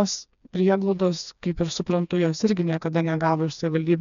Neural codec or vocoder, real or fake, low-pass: codec, 16 kHz, 2 kbps, FreqCodec, smaller model; fake; 7.2 kHz